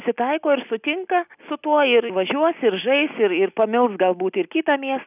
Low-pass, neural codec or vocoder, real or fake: 3.6 kHz; none; real